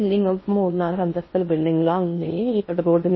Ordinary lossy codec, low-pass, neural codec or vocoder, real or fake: MP3, 24 kbps; 7.2 kHz; codec, 16 kHz in and 24 kHz out, 0.6 kbps, FocalCodec, streaming, 4096 codes; fake